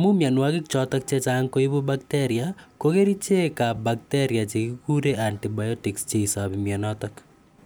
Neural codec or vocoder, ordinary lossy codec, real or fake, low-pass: none; none; real; none